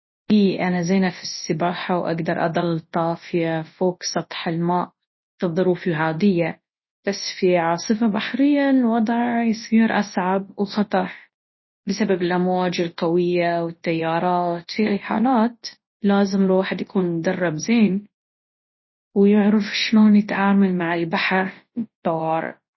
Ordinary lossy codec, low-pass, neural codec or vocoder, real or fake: MP3, 24 kbps; 7.2 kHz; codec, 24 kHz, 0.9 kbps, WavTokenizer, large speech release; fake